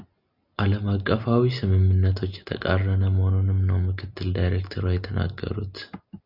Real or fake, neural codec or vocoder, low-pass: real; none; 5.4 kHz